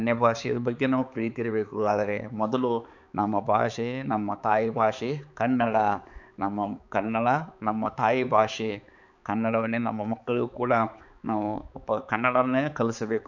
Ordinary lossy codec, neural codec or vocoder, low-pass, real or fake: none; codec, 16 kHz, 4 kbps, X-Codec, HuBERT features, trained on balanced general audio; 7.2 kHz; fake